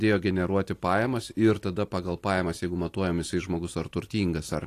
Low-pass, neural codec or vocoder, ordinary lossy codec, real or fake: 14.4 kHz; none; AAC, 48 kbps; real